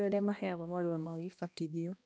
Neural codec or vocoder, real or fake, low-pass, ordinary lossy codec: codec, 16 kHz, 1 kbps, X-Codec, HuBERT features, trained on balanced general audio; fake; none; none